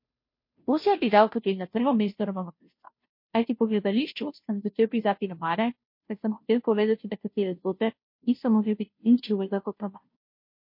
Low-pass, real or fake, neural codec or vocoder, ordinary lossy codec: 5.4 kHz; fake; codec, 16 kHz, 0.5 kbps, FunCodec, trained on Chinese and English, 25 frames a second; MP3, 32 kbps